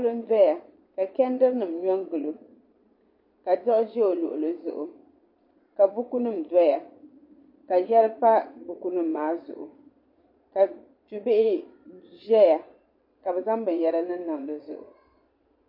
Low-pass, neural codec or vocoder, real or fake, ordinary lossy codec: 5.4 kHz; vocoder, 22.05 kHz, 80 mel bands, Vocos; fake; MP3, 32 kbps